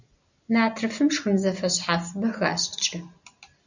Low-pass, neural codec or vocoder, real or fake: 7.2 kHz; none; real